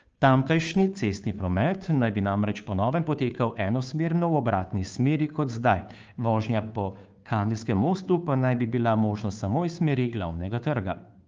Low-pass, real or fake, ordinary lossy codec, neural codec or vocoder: 7.2 kHz; fake; Opus, 24 kbps; codec, 16 kHz, 2 kbps, FunCodec, trained on Chinese and English, 25 frames a second